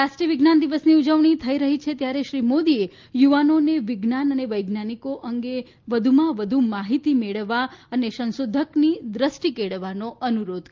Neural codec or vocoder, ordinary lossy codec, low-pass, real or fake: none; Opus, 32 kbps; 7.2 kHz; real